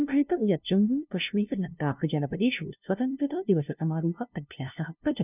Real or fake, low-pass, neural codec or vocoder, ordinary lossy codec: fake; 3.6 kHz; codec, 16 kHz, 0.5 kbps, FunCodec, trained on LibriTTS, 25 frames a second; none